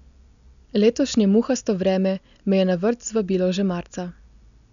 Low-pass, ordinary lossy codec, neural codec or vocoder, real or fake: 7.2 kHz; none; none; real